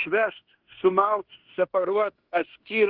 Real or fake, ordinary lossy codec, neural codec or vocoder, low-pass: fake; Opus, 16 kbps; codec, 16 kHz in and 24 kHz out, 0.9 kbps, LongCat-Audio-Codec, fine tuned four codebook decoder; 5.4 kHz